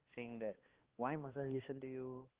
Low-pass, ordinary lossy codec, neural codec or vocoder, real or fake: 3.6 kHz; Opus, 16 kbps; codec, 16 kHz, 2 kbps, X-Codec, HuBERT features, trained on balanced general audio; fake